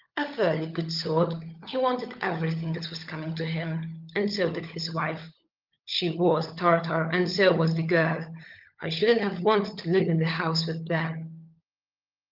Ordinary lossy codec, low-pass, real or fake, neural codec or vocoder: Opus, 32 kbps; 5.4 kHz; fake; codec, 16 kHz, 16 kbps, FunCodec, trained on LibriTTS, 50 frames a second